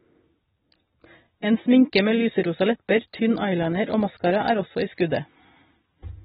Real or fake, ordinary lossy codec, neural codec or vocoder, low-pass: real; AAC, 16 kbps; none; 10.8 kHz